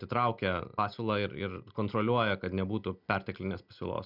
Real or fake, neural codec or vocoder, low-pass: real; none; 5.4 kHz